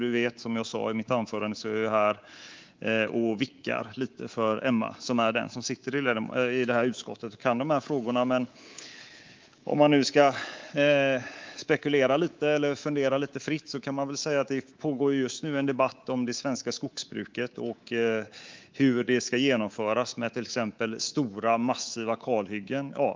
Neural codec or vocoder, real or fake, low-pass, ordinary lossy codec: codec, 24 kHz, 3.1 kbps, DualCodec; fake; 7.2 kHz; Opus, 32 kbps